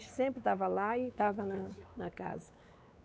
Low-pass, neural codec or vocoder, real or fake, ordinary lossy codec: none; codec, 16 kHz, 4 kbps, X-Codec, WavLM features, trained on Multilingual LibriSpeech; fake; none